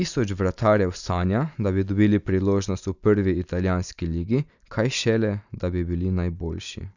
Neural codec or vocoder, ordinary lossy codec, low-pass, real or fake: none; none; 7.2 kHz; real